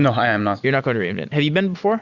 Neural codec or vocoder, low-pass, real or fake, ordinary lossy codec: none; 7.2 kHz; real; Opus, 64 kbps